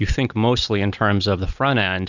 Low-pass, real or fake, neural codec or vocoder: 7.2 kHz; real; none